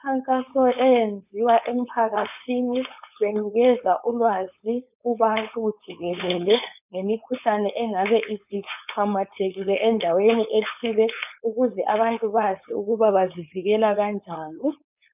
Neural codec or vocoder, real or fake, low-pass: codec, 16 kHz, 4.8 kbps, FACodec; fake; 3.6 kHz